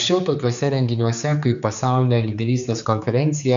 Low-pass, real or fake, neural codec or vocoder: 7.2 kHz; fake; codec, 16 kHz, 4 kbps, X-Codec, HuBERT features, trained on general audio